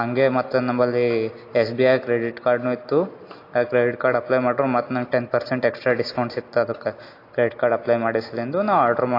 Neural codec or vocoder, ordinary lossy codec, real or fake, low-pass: none; AAC, 32 kbps; real; 5.4 kHz